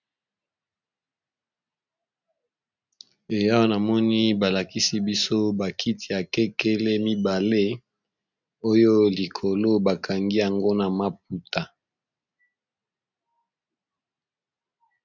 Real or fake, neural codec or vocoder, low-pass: real; none; 7.2 kHz